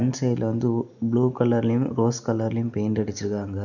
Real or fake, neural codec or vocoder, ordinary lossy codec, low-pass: real; none; none; 7.2 kHz